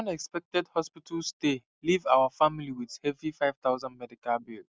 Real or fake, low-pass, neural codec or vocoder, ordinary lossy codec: real; none; none; none